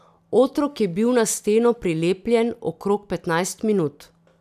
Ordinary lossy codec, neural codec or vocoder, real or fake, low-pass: none; none; real; 14.4 kHz